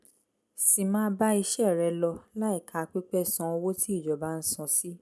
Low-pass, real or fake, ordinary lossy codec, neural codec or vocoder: none; real; none; none